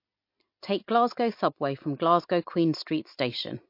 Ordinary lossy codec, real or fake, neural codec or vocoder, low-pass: MP3, 32 kbps; real; none; 5.4 kHz